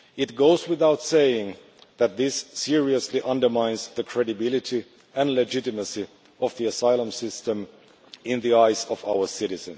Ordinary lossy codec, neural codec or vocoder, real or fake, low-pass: none; none; real; none